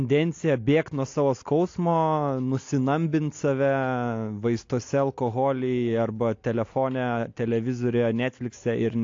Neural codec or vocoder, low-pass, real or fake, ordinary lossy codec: none; 7.2 kHz; real; AAC, 48 kbps